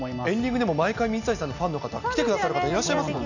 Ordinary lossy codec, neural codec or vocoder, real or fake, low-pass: none; none; real; 7.2 kHz